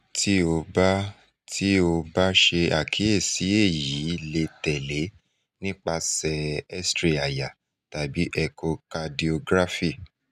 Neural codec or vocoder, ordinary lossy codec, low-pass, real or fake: none; none; 14.4 kHz; real